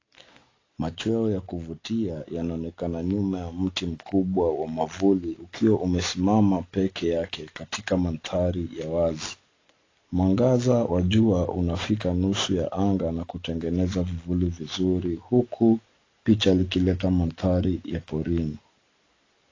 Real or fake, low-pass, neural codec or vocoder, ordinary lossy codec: real; 7.2 kHz; none; AAC, 32 kbps